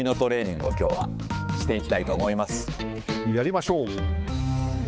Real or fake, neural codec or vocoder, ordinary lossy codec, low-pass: fake; codec, 16 kHz, 4 kbps, X-Codec, HuBERT features, trained on balanced general audio; none; none